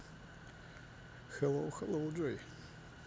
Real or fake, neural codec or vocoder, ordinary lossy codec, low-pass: real; none; none; none